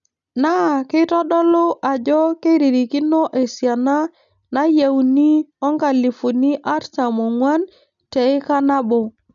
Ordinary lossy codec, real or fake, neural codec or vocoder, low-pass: none; real; none; 7.2 kHz